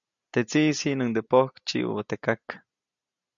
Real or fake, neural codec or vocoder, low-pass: real; none; 7.2 kHz